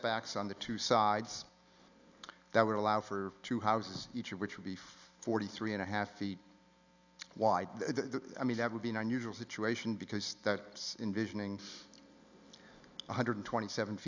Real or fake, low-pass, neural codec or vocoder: real; 7.2 kHz; none